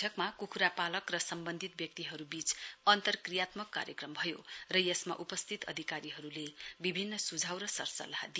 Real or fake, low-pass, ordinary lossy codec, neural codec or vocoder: real; none; none; none